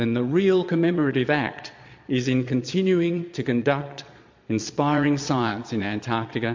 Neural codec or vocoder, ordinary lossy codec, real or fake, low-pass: vocoder, 22.05 kHz, 80 mel bands, Vocos; MP3, 48 kbps; fake; 7.2 kHz